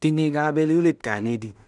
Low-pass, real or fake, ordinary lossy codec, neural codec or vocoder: 10.8 kHz; fake; none; codec, 16 kHz in and 24 kHz out, 0.4 kbps, LongCat-Audio-Codec, two codebook decoder